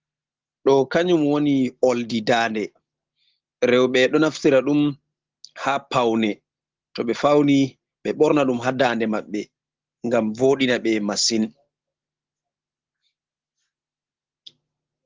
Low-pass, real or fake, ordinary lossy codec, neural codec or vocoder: 7.2 kHz; real; Opus, 16 kbps; none